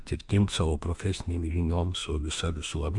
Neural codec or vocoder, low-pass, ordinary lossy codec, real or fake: autoencoder, 48 kHz, 32 numbers a frame, DAC-VAE, trained on Japanese speech; 10.8 kHz; AAC, 64 kbps; fake